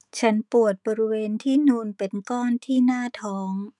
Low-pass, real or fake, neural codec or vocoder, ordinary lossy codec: 10.8 kHz; fake; autoencoder, 48 kHz, 128 numbers a frame, DAC-VAE, trained on Japanese speech; none